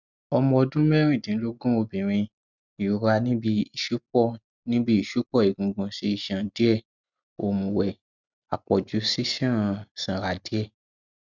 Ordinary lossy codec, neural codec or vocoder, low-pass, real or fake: none; none; 7.2 kHz; real